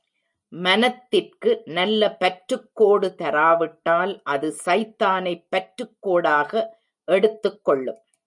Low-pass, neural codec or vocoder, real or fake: 10.8 kHz; none; real